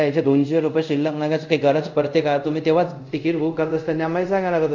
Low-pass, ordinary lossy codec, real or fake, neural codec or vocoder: 7.2 kHz; MP3, 64 kbps; fake; codec, 24 kHz, 0.5 kbps, DualCodec